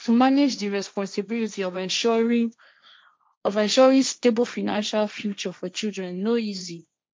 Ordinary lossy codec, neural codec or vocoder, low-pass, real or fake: none; codec, 16 kHz, 1.1 kbps, Voila-Tokenizer; none; fake